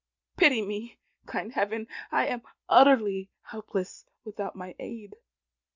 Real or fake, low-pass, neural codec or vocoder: real; 7.2 kHz; none